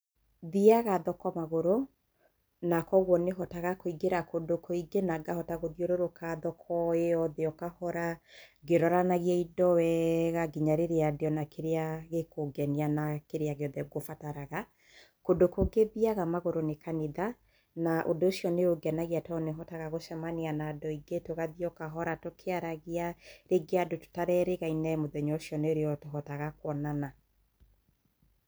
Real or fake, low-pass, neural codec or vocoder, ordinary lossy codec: real; none; none; none